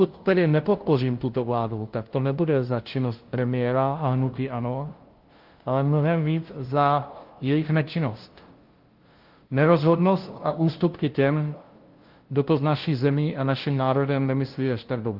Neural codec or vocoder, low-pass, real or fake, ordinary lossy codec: codec, 16 kHz, 0.5 kbps, FunCodec, trained on LibriTTS, 25 frames a second; 5.4 kHz; fake; Opus, 16 kbps